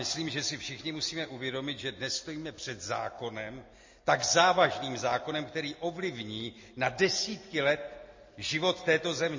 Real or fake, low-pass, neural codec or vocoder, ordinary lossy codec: real; 7.2 kHz; none; MP3, 32 kbps